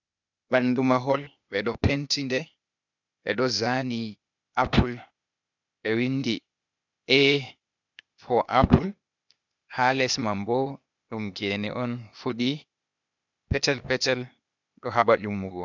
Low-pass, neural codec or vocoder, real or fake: 7.2 kHz; codec, 16 kHz, 0.8 kbps, ZipCodec; fake